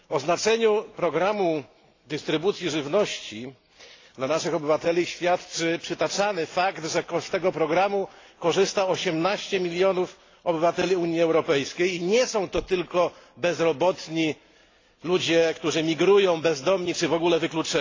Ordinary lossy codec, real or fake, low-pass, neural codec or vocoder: AAC, 32 kbps; real; 7.2 kHz; none